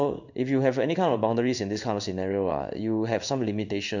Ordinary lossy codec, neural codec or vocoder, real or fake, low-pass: none; codec, 16 kHz in and 24 kHz out, 1 kbps, XY-Tokenizer; fake; 7.2 kHz